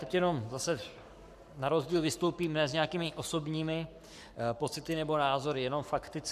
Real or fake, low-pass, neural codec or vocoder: fake; 14.4 kHz; codec, 44.1 kHz, 7.8 kbps, Pupu-Codec